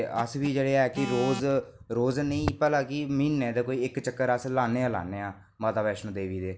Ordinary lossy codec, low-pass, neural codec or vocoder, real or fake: none; none; none; real